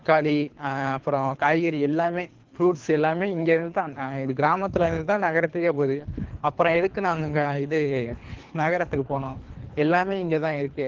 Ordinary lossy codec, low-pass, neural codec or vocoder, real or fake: Opus, 16 kbps; 7.2 kHz; codec, 24 kHz, 3 kbps, HILCodec; fake